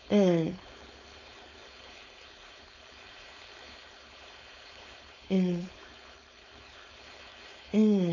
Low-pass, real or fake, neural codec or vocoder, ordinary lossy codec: 7.2 kHz; fake; codec, 16 kHz, 4.8 kbps, FACodec; none